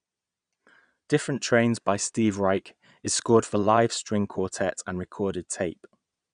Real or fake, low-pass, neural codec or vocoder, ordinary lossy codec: fake; 9.9 kHz; vocoder, 22.05 kHz, 80 mel bands, Vocos; none